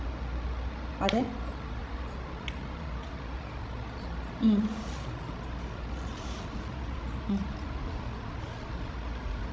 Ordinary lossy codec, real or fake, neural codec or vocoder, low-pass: none; fake; codec, 16 kHz, 16 kbps, FreqCodec, larger model; none